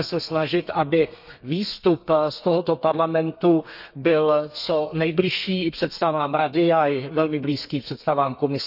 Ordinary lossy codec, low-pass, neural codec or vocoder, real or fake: none; 5.4 kHz; codec, 32 kHz, 1.9 kbps, SNAC; fake